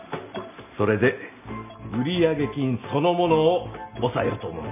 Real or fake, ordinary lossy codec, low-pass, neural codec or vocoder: real; none; 3.6 kHz; none